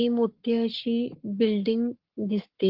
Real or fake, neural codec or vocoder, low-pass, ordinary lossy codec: fake; codec, 44.1 kHz, 7.8 kbps, Pupu-Codec; 5.4 kHz; Opus, 16 kbps